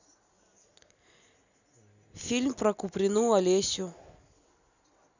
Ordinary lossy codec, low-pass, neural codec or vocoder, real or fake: none; 7.2 kHz; none; real